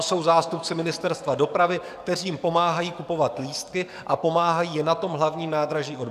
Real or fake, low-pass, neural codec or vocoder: fake; 14.4 kHz; codec, 44.1 kHz, 7.8 kbps, DAC